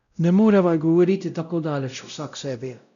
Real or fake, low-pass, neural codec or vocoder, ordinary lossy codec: fake; 7.2 kHz; codec, 16 kHz, 0.5 kbps, X-Codec, WavLM features, trained on Multilingual LibriSpeech; none